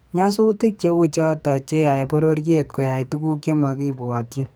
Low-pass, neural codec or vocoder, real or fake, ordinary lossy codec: none; codec, 44.1 kHz, 2.6 kbps, SNAC; fake; none